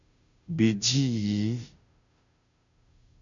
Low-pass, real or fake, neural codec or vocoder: 7.2 kHz; fake; codec, 16 kHz, 0.5 kbps, FunCodec, trained on Chinese and English, 25 frames a second